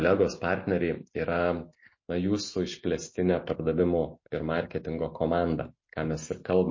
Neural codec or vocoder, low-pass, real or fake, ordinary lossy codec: none; 7.2 kHz; real; MP3, 32 kbps